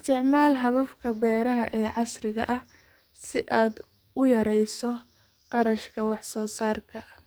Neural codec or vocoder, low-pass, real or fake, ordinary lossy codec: codec, 44.1 kHz, 2.6 kbps, SNAC; none; fake; none